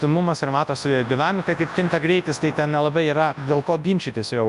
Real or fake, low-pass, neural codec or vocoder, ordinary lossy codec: fake; 10.8 kHz; codec, 24 kHz, 0.9 kbps, WavTokenizer, large speech release; MP3, 96 kbps